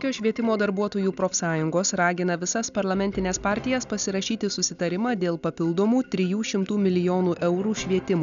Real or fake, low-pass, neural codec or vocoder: real; 7.2 kHz; none